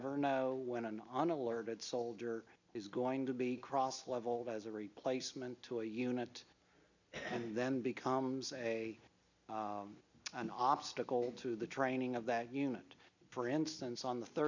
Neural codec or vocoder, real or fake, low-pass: none; real; 7.2 kHz